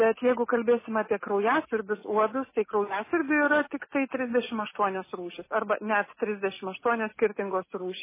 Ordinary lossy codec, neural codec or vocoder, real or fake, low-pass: MP3, 16 kbps; none; real; 3.6 kHz